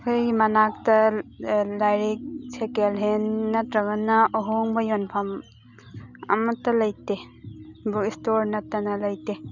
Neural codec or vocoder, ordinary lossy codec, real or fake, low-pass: none; none; real; 7.2 kHz